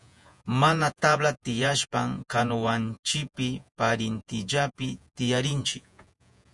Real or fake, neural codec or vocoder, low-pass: fake; vocoder, 48 kHz, 128 mel bands, Vocos; 10.8 kHz